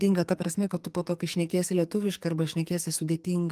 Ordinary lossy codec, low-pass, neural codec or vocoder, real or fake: Opus, 32 kbps; 14.4 kHz; codec, 44.1 kHz, 2.6 kbps, SNAC; fake